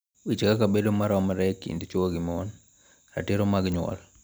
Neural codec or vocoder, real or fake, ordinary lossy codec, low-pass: none; real; none; none